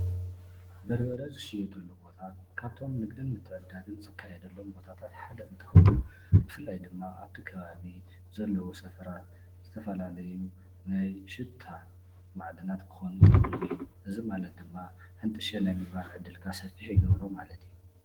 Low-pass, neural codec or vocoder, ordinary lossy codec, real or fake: 19.8 kHz; autoencoder, 48 kHz, 128 numbers a frame, DAC-VAE, trained on Japanese speech; Opus, 16 kbps; fake